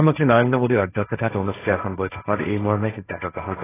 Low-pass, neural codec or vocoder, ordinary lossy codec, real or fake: 3.6 kHz; codec, 16 kHz, 1.1 kbps, Voila-Tokenizer; AAC, 16 kbps; fake